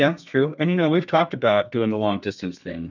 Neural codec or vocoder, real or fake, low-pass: codec, 32 kHz, 1.9 kbps, SNAC; fake; 7.2 kHz